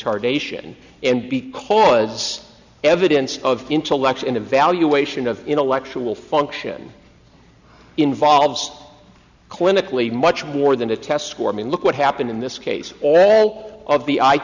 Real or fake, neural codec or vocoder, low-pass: real; none; 7.2 kHz